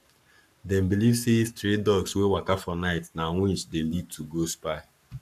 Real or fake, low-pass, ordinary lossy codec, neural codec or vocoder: fake; 14.4 kHz; none; codec, 44.1 kHz, 7.8 kbps, Pupu-Codec